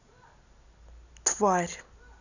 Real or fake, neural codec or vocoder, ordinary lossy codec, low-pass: real; none; none; 7.2 kHz